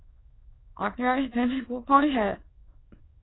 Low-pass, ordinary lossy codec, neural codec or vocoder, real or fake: 7.2 kHz; AAC, 16 kbps; autoencoder, 22.05 kHz, a latent of 192 numbers a frame, VITS, trained on many speakers; fake